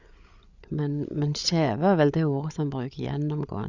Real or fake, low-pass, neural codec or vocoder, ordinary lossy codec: fake; 7.2 kHz; codec, 16 kHz, 8 kbps, FreqCodec, larger model; Opus, 64 kbps